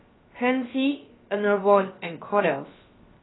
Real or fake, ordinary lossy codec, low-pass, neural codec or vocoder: fake; AAC, 16 kbps; 7.2 kHz; codec, 16 kHz, about 1 kbps, DyCAST, with the encoder's durations